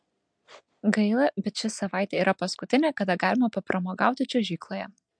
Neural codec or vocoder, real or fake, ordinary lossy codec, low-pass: none; real; MP3, 64 kbps; 9.9 kHz